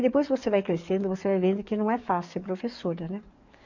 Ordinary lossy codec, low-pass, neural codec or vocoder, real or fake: none; 7.2 kHz; codec, 44.1 kHz, 7.8 kbps, DAC; fake